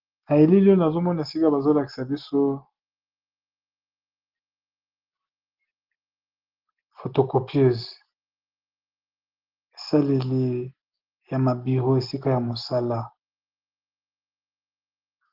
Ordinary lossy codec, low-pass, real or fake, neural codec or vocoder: Opus, 16 kbps; 5.4 kHz; real; none